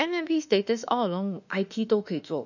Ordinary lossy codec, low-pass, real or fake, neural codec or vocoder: none; 7.2 kHz; fake; autoencoder, 48 kHz, 32 numbers a frame, DAC-VAE, trained on Japanese speech